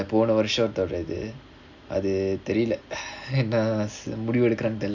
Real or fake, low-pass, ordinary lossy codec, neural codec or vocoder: real; 7.2 kHz; none; none